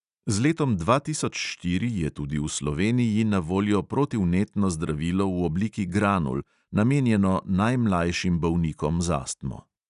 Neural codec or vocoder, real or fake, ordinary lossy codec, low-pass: none; real; none; 10.8 kHz